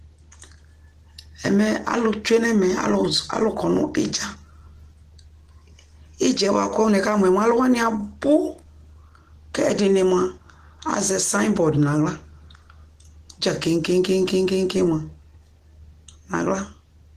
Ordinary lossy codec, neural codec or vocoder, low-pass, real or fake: Opus, 16 kbps; none; 14.4 kHz; real